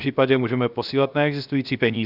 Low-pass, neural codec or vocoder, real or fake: 5.4 kHz; codec, 16 kHz, 0.7 kbps, FocalCodec; fake